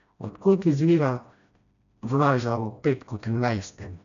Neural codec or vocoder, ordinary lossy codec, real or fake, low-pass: codec, 16 kHz, 1 kbps, FreqCodec, smaller model; none; fake; 7.2 kHz